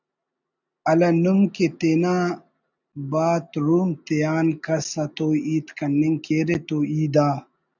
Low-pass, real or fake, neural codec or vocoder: 7.2 kHz; real; none